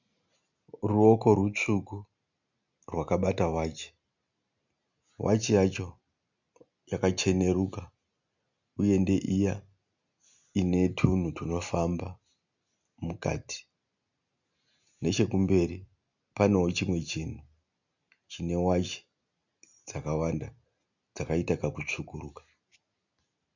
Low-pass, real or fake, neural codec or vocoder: 7.2 kHz; real; none